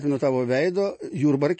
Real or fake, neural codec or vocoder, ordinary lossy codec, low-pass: real; none; MP3, 32 kbps; 9.9 kHz